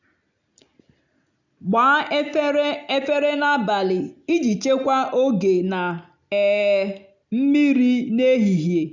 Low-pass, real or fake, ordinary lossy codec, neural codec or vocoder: 7.2 kHz; real; none; none